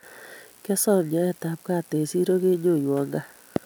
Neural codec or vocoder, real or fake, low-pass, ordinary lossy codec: none; real; none; none